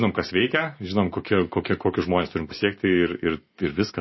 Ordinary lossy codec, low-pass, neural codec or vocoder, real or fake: MP3, 24 kbps; 7.2 kHz; none; real